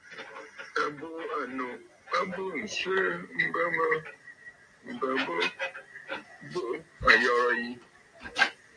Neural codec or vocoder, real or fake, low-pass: none; real; 9.9 kHz